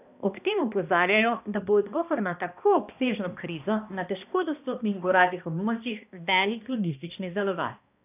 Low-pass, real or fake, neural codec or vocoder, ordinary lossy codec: 3.6 kHz; fake; codec, 16 kHz, 1 kbps, X-Codec, HuBERT features, trained on balanced general audio; none